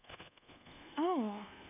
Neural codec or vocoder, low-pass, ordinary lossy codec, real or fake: codec, 24 kHz, 1.2 kbps, DualCodec; 3.6 kHz; none; fake